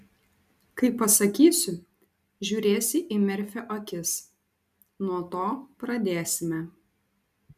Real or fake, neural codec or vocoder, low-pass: real; none; 14.4 kHz